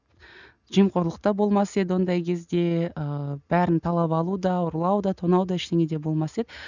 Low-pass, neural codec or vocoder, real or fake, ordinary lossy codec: 7.2 kHz; none; real; none